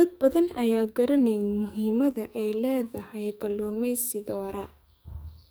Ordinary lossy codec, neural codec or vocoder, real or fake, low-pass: none; codec, 44.1 kHz, 2.6 kbps, SNAC; fake; none